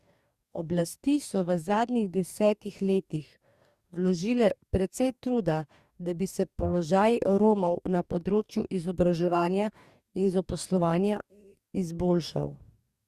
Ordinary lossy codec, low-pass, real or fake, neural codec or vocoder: Opus, 64 kbps; 14.4 kHz; fake; codec, 44.1 kHz, 2.6 kbps, DAC